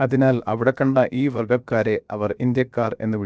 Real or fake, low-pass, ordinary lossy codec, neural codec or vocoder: fake; none; none; codec, 16 kHz, 0.7 kbps, FocalCodec